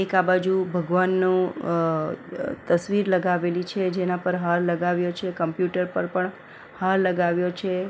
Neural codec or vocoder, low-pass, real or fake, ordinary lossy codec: none; none; real; none